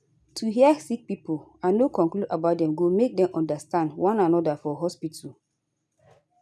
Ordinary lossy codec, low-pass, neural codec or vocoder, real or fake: none; none; none; real